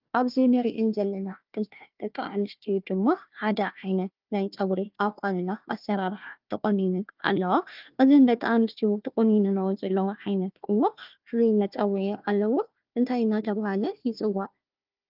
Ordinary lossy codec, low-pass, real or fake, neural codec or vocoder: Opus, 24 kbps; 5.4 kHz; fake; codec, 16 kHz, 1 kbps, FunCodec, trained on Chinese and English, 50 frames a second